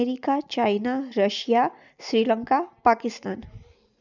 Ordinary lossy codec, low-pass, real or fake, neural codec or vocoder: none; 7.2 kHz; real; none